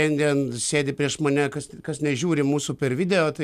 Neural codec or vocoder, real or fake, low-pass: none; real; 14.4 kHz